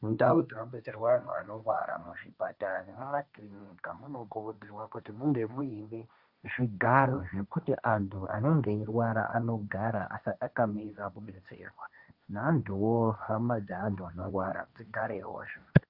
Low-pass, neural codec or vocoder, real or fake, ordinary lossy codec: 5.4 kHz; codec, 16 kHz, 1.1 kbps, Voila-Tokenizer; fake; Opus, 64 kbps